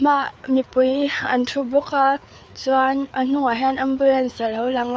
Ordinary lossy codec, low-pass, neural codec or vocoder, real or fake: none; none; codec, 16 kHz, 4 kbps, FreqCodec, larger model; fake